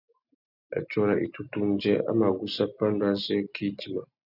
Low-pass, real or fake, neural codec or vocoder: 5.4 kHz; real; none